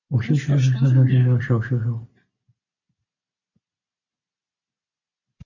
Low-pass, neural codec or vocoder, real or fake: 7.2 kHz; none; real